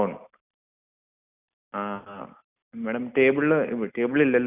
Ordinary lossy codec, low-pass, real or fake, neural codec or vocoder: none; 3.6 kHz; real; none